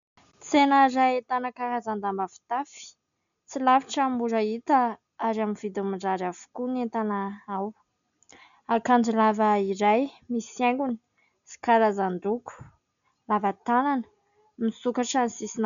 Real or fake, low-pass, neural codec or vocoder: real; 7.2 kHz; none